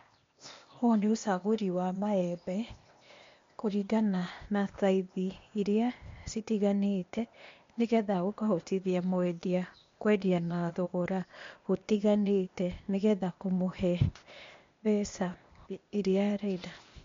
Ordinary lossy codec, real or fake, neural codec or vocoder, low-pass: MP3, 48 kbps; fake; codec, 16 kHz, 0.8 kbps, ZipCodec; 7.2 kHz